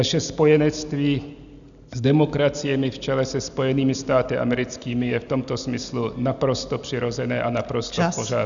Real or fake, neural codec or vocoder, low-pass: real; none; 7.2 kHz